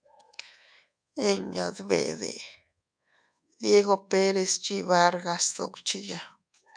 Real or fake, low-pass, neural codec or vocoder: fake; 9.9 kHz; codec, 24 kHz, 1.2 kbps, DualCodec